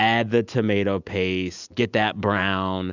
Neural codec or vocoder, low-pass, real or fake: none; 7.2 kHz; real